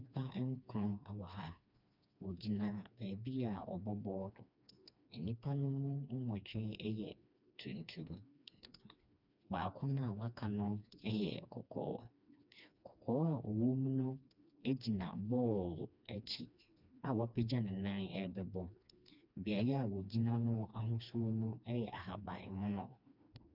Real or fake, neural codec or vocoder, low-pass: fake; codec, 16 kHz, 2 kbps, FreqCodec, smaller model; 5.4 kHz